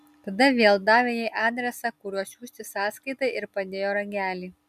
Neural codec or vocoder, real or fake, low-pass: none; real; 14.4 kHz